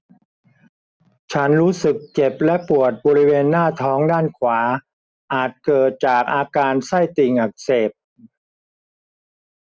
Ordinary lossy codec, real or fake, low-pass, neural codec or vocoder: none; real; none; none